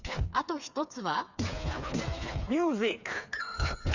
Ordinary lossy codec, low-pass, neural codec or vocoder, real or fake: none; 7.2 kHz; codec, 16 kHz, 4 kbps, FreqCodec, smaller model; fake